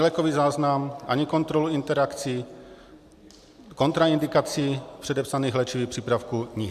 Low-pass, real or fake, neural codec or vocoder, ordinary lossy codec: 14.4 kHz; real; none; AAC, 96 kbps